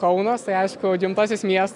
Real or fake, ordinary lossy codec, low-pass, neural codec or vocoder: real; MP3, 96 kbps; 10.8 kHz; none